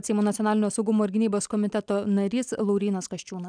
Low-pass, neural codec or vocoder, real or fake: 9.9 kHz; none; real